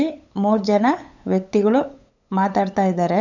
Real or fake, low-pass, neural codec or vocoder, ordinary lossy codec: fake; 7.2 kHz; codec, 16 kHz, 8 kbps, FunCodec, trained on LibriTTS, 25 frames a second; none